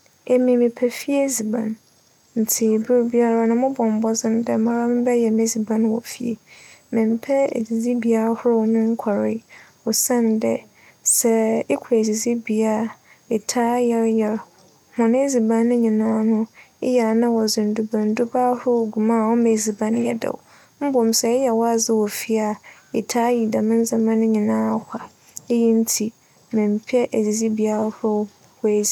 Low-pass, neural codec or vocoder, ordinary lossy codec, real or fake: 19.8 kHz; none; none; real